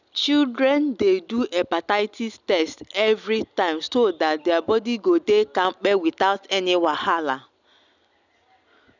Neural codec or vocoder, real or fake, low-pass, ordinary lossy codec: none; real; 7.2 kHz; none